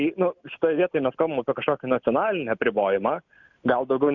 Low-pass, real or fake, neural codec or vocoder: 7.2 kHz; real; none